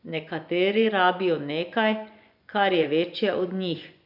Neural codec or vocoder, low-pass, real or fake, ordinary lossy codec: vocoder, 24 kHz, 100 mel bands, Vocos; 5.4 kHz; fake; none